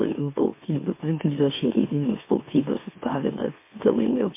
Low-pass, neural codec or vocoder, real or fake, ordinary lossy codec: 3.6 kHz; autoencoder, 44.1 kHz, a latent of 192 numbers a frame, MeloTTS; fake; MP3, 24 kbps